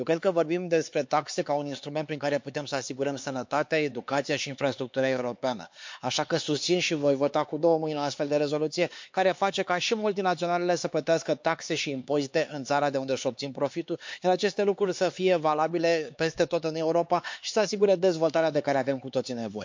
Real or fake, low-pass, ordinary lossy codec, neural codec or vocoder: fake; 7.2 kHz; MP3, 48 kbps; codec, 16 kHz, 4 kbps, X-Codec, HuBERT features, trained on LibriSpeech